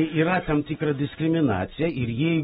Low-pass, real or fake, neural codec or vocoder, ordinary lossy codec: 19.8 kHz; real; none; AAC, 16 kbps